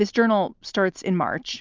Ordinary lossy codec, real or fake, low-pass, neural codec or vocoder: Opus, 32 kbps; real; 7.2 kHz; none